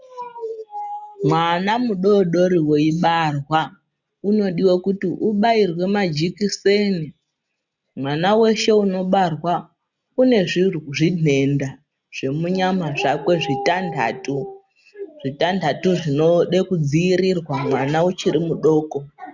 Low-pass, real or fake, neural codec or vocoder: 7.2 kHz; real; none